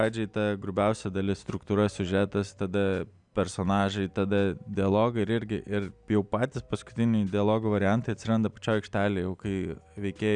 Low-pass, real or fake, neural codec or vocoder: 9.9 kHz; real; none